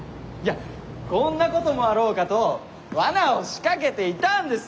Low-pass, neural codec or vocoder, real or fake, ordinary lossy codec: none; none; real; none